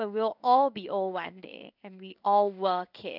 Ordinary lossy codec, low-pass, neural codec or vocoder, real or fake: none; 5.4 kHz; codec, 16 kHz in and 24 kHz out, 1 kbps, XY-Tokenizer; fake